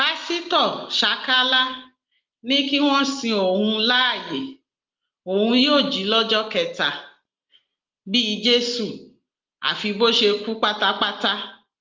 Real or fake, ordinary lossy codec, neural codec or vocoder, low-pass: real; Opus, 32 kbps; none; 7.2 kHz